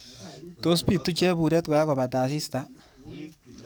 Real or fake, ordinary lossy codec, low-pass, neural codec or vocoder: fake; none; none; codec, 44.1 kHz, 7.8 kbps, DAC